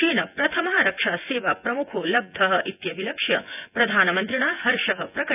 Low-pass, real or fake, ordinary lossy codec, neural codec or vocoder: 3.6 kHz; fake; none; vocoder, 24 kHz, 100 mel bands, Vocos